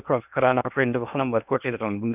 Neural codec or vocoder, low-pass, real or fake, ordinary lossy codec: codec, 16 kHz in and 24 kHz out, 0.8 kbps, FocalCodec, streaming, 65536 codes; 3.6 kHz; fake; none